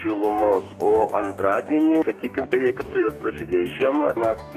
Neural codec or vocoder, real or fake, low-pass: codec, 44.1 kHz, 2.6 kbps, DAC; fake; 14.4 kHz